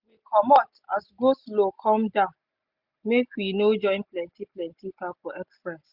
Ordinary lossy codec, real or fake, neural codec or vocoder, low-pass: Opus, 64 kbps; real; none; 5.4 kHz